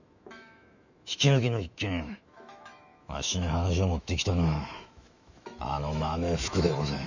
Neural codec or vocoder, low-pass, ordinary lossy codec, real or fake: autoencoder, 48 kHz, 128 numbers a frame, DAC-VAE, trained on Japanese speech; 7.2 kHz; none; fake